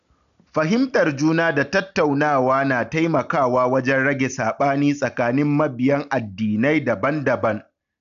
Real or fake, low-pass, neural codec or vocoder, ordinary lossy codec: real; 7.2 kHz; none; none